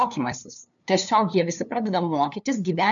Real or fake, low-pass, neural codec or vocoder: fake; 7.2 kHz; codec, 16 kHz, 2 kbps, FunCodec, trained on LibriTTS, 25 frames a second